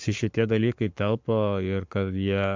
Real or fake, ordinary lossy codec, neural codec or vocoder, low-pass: fake; MP3, 64 kbps; codec, 44.1 kHz, 7.8 kbps, Pupu-Codec; 7.2 kHz